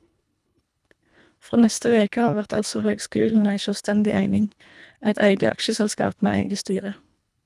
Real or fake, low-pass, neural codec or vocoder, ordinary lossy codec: fake; none; codec, 24 kHz, 1.5 kbps, HILCodec; none